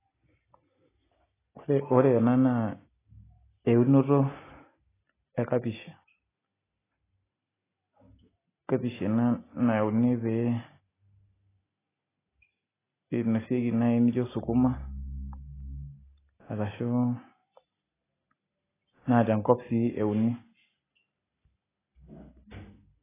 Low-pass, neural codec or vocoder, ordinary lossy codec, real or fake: 3.6 kHz; none; AAC, 16 kbps; real